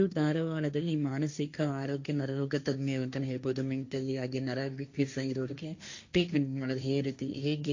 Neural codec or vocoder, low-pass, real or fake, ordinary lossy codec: codec, 16 kHz, 1.1 kbps, Voila-Tokenizer; 7.2 kHz; fake; AAC, 48 kbps